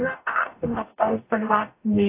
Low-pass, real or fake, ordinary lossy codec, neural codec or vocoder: 3.6 kHz; fake; AAC, 24 kbps; codec, 44.1 kHz, 0.9 kbps, DAC